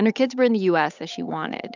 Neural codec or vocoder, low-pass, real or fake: codec, 16 kHz, 8 kbps, FunCodec, trained on Chinese and English, 25 frames a second; 7.2 kHz; fake